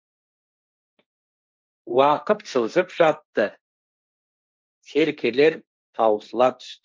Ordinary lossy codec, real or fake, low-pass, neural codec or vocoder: none; fake; none; codec, 16 kHz, 1.1 kbps, Voila-Tokenizer